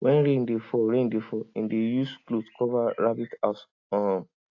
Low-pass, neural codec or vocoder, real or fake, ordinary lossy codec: 7.2 kHz; none; real; AAC, 48 kbps